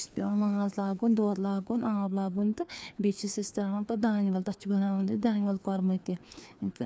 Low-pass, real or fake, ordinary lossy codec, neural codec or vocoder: none; fake; none; codec, 16 kHz, 2 kbps, FreqCodec, larger model